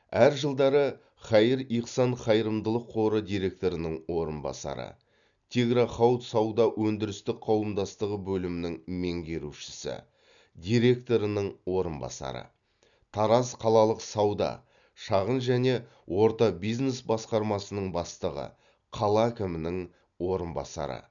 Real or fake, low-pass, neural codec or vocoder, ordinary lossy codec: real; 7.2 kHz; none; none